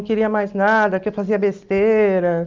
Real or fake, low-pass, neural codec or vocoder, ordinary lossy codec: real; 7.2 kHz; none; Opus, 32 kbps